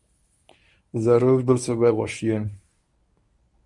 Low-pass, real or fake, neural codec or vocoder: 10.8 kHz; fake; codec, 24 kHz, 0.9 kbps, WavTokenizer, medium speech release version 1